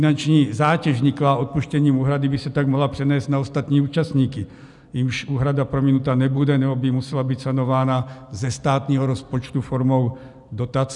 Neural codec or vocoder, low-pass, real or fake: none; 10.8 kHz; real